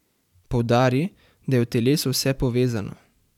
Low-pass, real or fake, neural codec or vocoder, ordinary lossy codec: 19.8 kHz; fake; vocoder, 44.1 kHz, 128 mel bands every 512 samples, BigVGAN v2; none